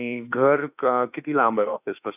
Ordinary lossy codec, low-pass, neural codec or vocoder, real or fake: none; 3.6 kHz; autoencoder, 48 kHz, 32 numbers a frame, DAC-VAE, trained on Japanese speech; fake